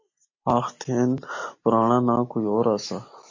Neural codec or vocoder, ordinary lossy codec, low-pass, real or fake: none; MP3, 32 kbps; 7.2 kHz; real